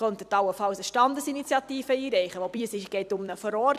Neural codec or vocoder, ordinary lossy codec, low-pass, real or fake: vocoder, 44.1 kHz, 128 mel bands every 256 samples, BigVGAN v2; none; 14.4 kHz; fake